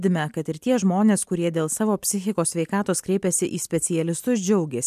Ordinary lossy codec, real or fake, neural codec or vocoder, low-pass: MP3, 96 kbps; real; none; 14.4 kHz